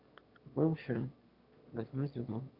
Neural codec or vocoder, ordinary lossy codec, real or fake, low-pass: autoencoder, 22.05 kHz, a latent of 192 numbers a frame, VITS, trained on one speaker; Opus, 64 kbps; fake; 5.4 kHz